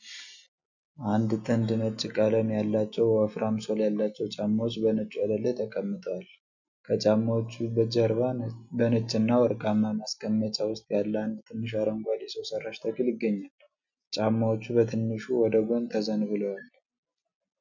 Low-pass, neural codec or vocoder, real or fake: 7.2 kHz; none; real